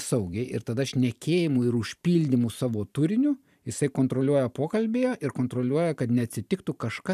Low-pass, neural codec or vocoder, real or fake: 14.4 kHz; vocoder, 44.1 kHz, 128 mel bands every 512 samples, BigVGAN v2; fake